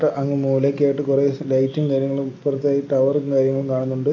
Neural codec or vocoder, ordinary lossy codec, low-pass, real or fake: none; AAC, 48 kbps; 7.2 kHz; real